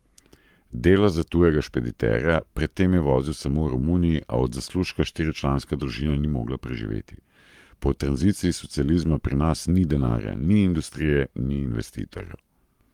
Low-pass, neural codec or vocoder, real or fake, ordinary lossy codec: 19.8 kHz; codec, 44.1 kHz, 7.8 kbps, Pupu-Codec; fake; Opus, 32 kbps